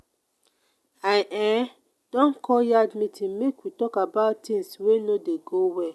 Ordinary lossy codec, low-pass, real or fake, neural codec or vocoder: none; none; real; none